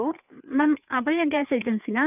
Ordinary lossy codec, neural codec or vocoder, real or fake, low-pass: none; codec, 16 kHz, 4 kbps, FreqCodec, larger model; fake; 3.6 kHz